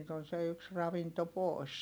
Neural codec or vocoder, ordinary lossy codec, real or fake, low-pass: none; none; real; none